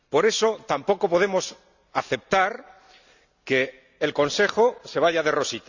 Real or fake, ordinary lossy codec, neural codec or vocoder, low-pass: real; none; none; 7.2 kHz